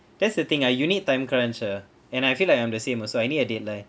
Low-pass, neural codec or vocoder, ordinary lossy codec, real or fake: none; none; none; real